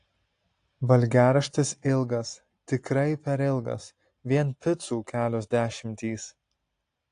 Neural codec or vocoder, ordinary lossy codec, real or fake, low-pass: none; AAC, 48 kbps; real; 9.9 kHz